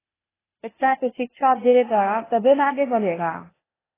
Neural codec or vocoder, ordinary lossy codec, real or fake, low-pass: codec, 16 kHz, 0.8 kbps, ZipCodec; AAC, 16 kbps; fake; 3.6 kHz